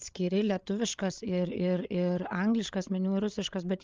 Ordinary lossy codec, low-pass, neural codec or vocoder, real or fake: Opus, 24 kbps; 7.2 kHz; codec, 16 kHz, 8 kbps, FreqCodec, larger model; fake